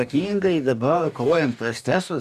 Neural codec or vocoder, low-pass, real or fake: codec, 44.1 kHz, 2.6 kbps, DAC; 14.4 kHz; fake